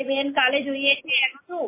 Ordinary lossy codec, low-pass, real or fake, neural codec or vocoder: MP3, 16 kbps; 3.6 kHz; real; none